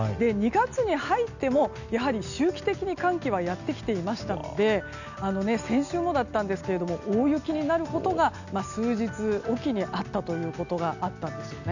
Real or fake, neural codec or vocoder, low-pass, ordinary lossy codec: real; none; 7.2 kHz; none